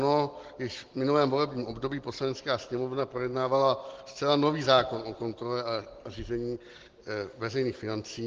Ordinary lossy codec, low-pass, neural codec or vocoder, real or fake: Opus, 16 kbps; 7.2 kHz; none; real